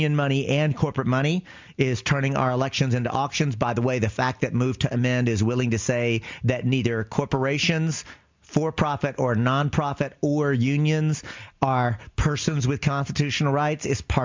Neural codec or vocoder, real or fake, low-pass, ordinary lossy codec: none; real; 7.2 kHz; MP3, 64 kbps